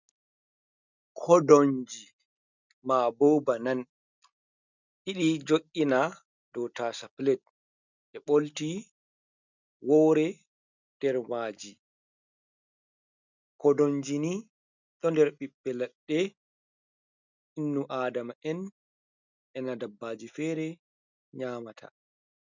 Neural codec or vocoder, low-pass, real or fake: none; 7.2 kHz; real